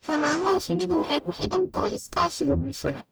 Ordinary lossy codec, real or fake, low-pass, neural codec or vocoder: none; fake; none; codec, 44.1 kHz, 0.9 kbps, DAC